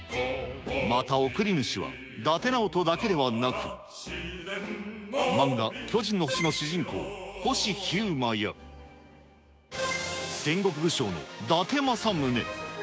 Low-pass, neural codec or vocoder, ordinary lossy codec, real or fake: none; codec, 16 kHz, 6 kbps, DAC; none; fake